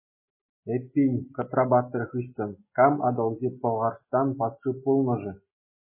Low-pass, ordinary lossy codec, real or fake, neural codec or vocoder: 3.6 kHz; MP3, 16 kbps; real; none